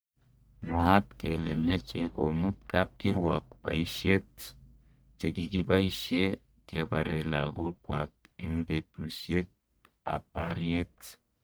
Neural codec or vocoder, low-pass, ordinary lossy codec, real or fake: codec, 44.1 kHz, 1.7 kbps, Pupu-Codec; none; none; fake